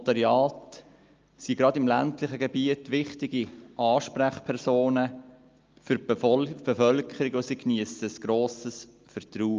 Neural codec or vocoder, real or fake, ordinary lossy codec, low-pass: none; real; Opus, 24 kbps; 7.2 kHz